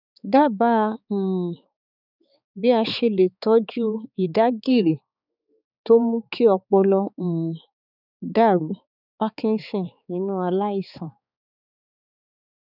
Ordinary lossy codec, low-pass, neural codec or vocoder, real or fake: none; 5.4 kHz; codec, 16 kHz, 4 kbps, X-Codec, HuBERT features, trained on balanced general audio; fake